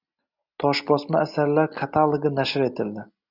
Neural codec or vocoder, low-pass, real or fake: none; 5.4 kHz; real